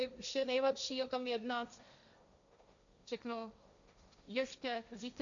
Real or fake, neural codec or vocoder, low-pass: fake; codec, 16 kHz, 1.1 kbps, Voila-Tokenizer; 7.2 kHz